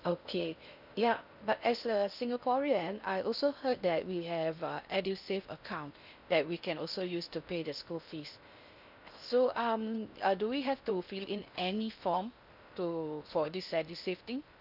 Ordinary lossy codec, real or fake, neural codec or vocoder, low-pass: none; fake; codec, 16 kHz in and 24 kHz out, 0.6 kbps, FocalCodec, streaming, 2048 codes; 5.4 kHz